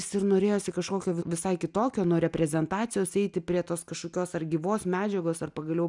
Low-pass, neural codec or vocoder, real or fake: 10.8 kHz; none; real